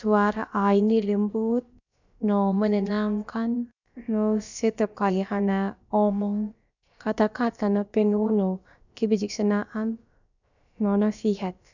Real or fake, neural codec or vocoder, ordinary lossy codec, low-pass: fake; codec, 16 kHz, about 1 kbps, DyCAST, with the encoder's durations; none; 7.2 kHz